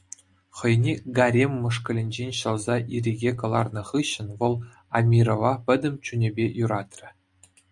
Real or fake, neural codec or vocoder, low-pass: real; none; 10.8 kHz